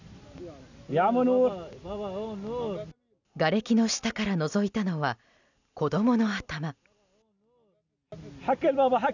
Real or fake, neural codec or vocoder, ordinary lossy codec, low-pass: real; none; none; 7.2 kHz